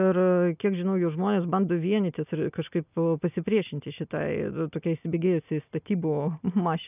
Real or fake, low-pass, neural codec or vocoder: real; 3.6 kHz; none